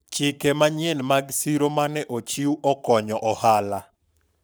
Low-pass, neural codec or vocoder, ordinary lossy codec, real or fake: none; codec, 44.1 kHz, 7.8 kbps, Pupu-Codec; none; fake